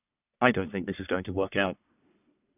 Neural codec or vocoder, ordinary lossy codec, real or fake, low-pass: codec, 44.1 kHz, 1.7 kbps, Pupu-Codec; none; fake; 3.6 kHz